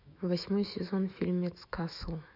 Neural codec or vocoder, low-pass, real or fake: autoencoder, 48 kHz, 128 numbers a frame, DAC-VAE, trained on Japanese speech; 5.4 kHz; fake